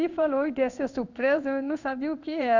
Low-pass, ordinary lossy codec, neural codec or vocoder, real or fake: 7.2 kHz; none; codec, 16 kHz in and 24 kHz out, 1 kbps, XY-Tokenizer; fake